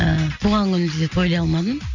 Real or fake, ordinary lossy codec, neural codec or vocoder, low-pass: real; none; none; 7.2 kHz